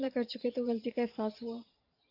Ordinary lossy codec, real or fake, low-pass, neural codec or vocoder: AAC, 32 kbps; fake; 5.4 kHz; vocoder, 44.1 kHz, 128 mel bands every 512 samples, BigVGAN v2